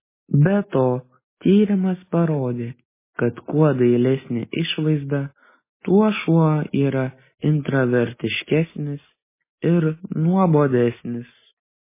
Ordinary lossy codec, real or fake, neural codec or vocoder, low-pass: MP3, 16 kbps; real; none; 3.6 kHz